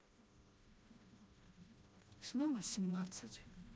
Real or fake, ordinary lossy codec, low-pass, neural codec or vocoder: fake; none; none; codec, 16 kHz, 1 kbps, FreqCodec, smaller model